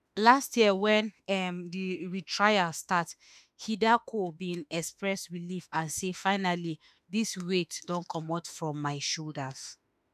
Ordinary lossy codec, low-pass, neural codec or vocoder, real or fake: none; 14.4 kHz; autoencoder, 48 kHz, 32 numbers a frame, DAC-VAE, trained on Japanese speech; fake